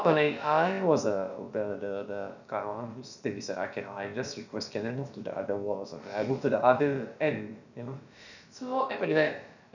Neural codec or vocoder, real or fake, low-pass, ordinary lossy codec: codec, 16 kHz, about 1 kbps, DyCAST, with the encoder's durations; fake; 7.2 kHz; none